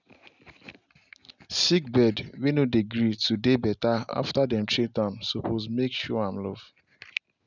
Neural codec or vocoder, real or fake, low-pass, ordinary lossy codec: none; real; 7.2 kHz; none